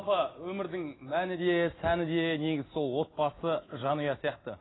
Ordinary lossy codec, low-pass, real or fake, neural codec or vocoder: AAC, 16 kbps; 7.2 kHz; real; none